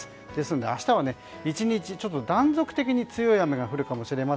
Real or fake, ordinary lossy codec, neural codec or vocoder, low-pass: real; none; none; none